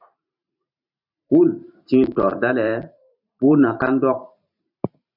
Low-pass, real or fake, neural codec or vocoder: 5.4 kHz; fake; vocoder, 44.1 kHz, 128 mel bands every 512 samples, BigVGAN v2